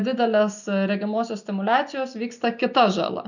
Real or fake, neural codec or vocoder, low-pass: real; none; 7.2 kHz